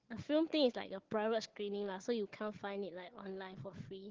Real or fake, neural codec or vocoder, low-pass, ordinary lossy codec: fake; codec, 16 kHz, 4 kbps, FunCodec, trained on Chinese and English, 50 frames a second; 7.2 kHz; Opus, 16 kbps